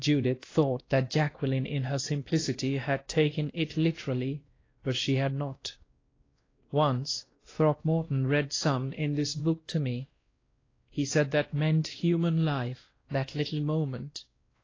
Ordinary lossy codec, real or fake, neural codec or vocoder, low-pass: AAC, 32 kbps; fake; codec, 16 kHz, 1 kbps, X-Codec, WavLM features, trained on Multilingual LibriSpeech; 7.2 kHz